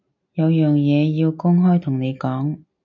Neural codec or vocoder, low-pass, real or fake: none; 7.2 kHz; real